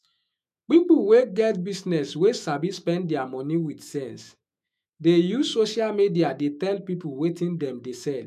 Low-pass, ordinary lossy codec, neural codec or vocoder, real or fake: 14.4 kHz; MP3, 96 kbps; autoencoder, 48 kHz, 128 numbers a frame, DAC-VAE, trained on Japanese speech; fake